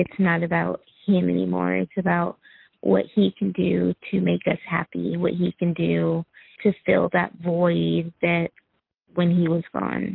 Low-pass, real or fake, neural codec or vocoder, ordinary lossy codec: 5.4 kHz; real; none; Opus, 24 kbps